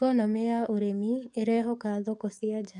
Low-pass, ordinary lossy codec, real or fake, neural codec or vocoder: 10.8 kHz; none; fake; codec, 44.1 kHz, 7.8 kbps, DAC